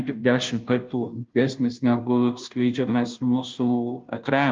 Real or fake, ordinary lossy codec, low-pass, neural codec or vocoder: fake; Opus, 24 kbps; 7.2 kHz; codec, 16 kHz, 0.5 kbps, FunCodec, trained on Chinese and English, 25 frames a second